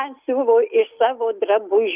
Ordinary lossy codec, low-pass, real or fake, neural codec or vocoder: Opus, 24 kbps; 3.6 kHz; real; none